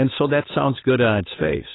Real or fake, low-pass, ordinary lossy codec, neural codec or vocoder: fake; 7.2 kHz; AAC, 16 kbps; codec, 16 kHz, 8 kbps, FunCodec, trained on Chinese and English, 25 frames a second